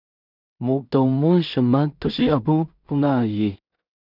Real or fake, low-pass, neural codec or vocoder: fake; 5.4 kHz; codec, 16 kHz in and 24 kHz out, 0.4 kbps, LongCat-Audio-Codec, two codebook decoder